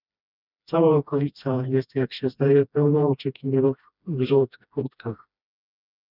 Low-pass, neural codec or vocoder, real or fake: 5.4 kHz; codec, 16 kHz, 1 kbps, FreqCodec, smaller model; fake